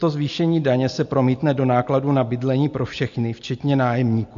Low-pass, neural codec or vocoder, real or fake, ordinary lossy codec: 7.2 kHz; none; real; MP3, 48 kbps